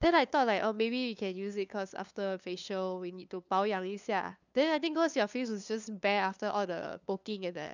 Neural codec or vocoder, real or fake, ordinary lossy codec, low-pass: codec, 16 kHz, 2 kbps, FunCodec, trained on LibriTTS, 25 frames a second; fake; none; 7.2 kHz